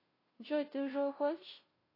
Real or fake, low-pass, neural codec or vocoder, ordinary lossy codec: fake; 5.4 kHz; codec, 16 kHz, 0.5 kbps, FunCodec, trained on Chinese and English, 25 frames a second; AAC, 24 kbps